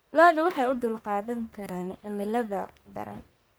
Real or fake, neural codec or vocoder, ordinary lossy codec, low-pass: fake; codec, 44.1 kHz, 1.7 kbps, Pupu-Codec; none; none